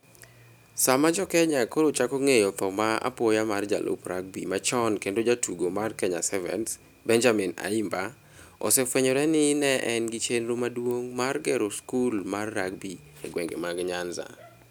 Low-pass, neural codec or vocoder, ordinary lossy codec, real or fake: none; none; none; real